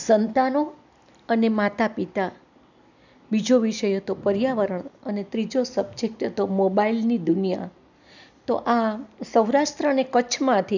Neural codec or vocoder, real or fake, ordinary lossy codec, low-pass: none; real; none; 7.2 kHz